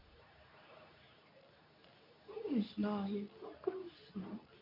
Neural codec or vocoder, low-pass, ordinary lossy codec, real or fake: codec, 24 kHz, 0.9 kbps, WavTokenizer, medium speech release version 2; 5.4 kHz; none; fake